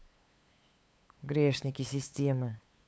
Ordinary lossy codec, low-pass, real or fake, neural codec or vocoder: none; none; fake; codec, 16 kHz, 8 kbps, FunCodec, trained on LibriTTS, 25 frames a second